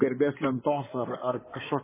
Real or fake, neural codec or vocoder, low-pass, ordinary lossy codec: fake; codec, 16 kHz in and 24 kHz out, 2.2 kbps, FireRedTTS-2 codec; 3.6 kHz; MP3, 16 kbps